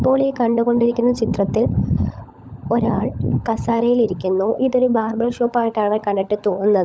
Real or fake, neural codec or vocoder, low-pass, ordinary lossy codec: fake; codec, 16 kHz, 16 kbps, FunCodec, trained on LibriTTS, 50 frames a second; none; none